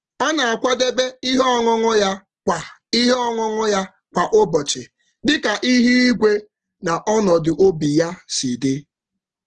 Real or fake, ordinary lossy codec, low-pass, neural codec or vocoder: real; Opus, 16 kbps; 10.8 kHz; none